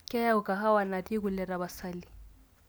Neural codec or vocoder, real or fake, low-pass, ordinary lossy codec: none; real; none; none